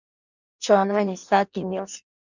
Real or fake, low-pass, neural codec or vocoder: fake; 7.2 kHz; codec, 16 kHz in and 24 kHz out, 0.6 kbps, FireRedTTS-2 codec